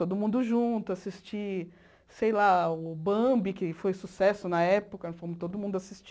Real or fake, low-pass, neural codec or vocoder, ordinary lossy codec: real; none; none; none